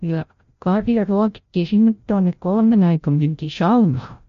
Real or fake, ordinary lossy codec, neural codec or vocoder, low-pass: fake; MP3, 48 kbps; codec, 16 kHz, 0.5 kbps, FreqCodec, larger model; 7.2 kHz